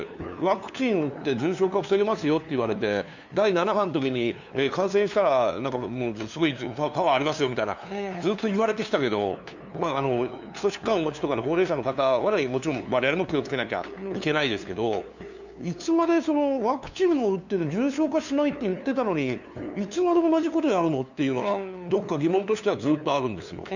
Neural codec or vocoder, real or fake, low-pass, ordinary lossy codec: codec, 16 kHz, 2 kbps, FunCodec, trained on LibriTTS, 25 frames a second; fake; 7.2 kHz; none